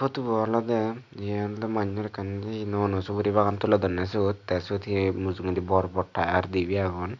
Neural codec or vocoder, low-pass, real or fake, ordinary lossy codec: none; 7.2 kHz; real; none